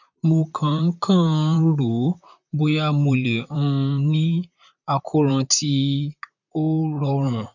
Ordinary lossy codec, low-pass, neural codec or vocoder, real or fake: none; 7.2 kHz; vocoder, 44.1 kHz, 128 mel bands, Pupu-Vocoder; fake